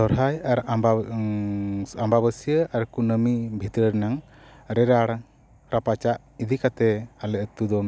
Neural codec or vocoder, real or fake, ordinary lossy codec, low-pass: none; real; none; none